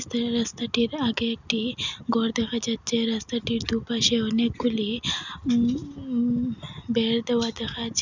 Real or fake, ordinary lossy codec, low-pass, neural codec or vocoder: real; none; 7.2 kHz; none